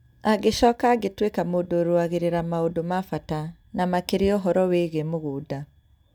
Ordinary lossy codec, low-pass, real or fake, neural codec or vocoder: none; 19.8 kHz; real; none